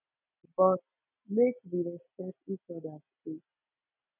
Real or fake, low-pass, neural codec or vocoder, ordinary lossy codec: real; 3.6 kHz; none; none